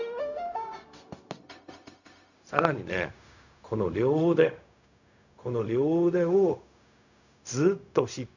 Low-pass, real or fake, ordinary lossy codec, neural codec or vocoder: 7.2 kHz; fake; none; codec, 16 kHz, 0.4 kbps, LongCat-Audio-Codec